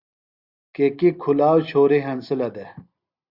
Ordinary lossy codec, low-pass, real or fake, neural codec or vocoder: Opus, 64 kbps; 5.4 kHz; real; none